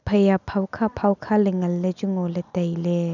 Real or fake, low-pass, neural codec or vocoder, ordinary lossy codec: real; 7.2 kHz; none; none